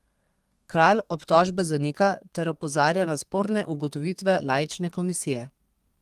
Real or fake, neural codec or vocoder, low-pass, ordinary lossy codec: fake; codec, 32 kHz, 1.9 kbps, SNAC; 14.4 kHz; Opus, 32 kbps